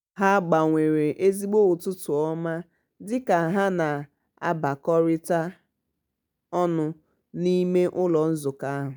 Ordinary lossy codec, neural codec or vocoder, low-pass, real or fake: none; none; none; real